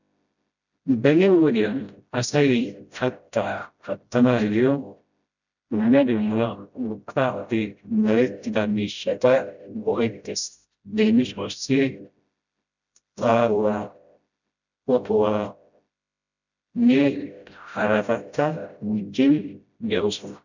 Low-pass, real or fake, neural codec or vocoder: 7.2 kHz; fake; codec, 16 kHz, 0.5 kbps, FreqCodec, smaller model